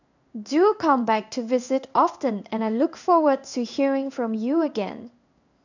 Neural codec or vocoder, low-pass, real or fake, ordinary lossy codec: codec, 16 kHz in and 24 kHz out, 1 kbps, XY-Tokenizer; 7.2 kHz; fake; none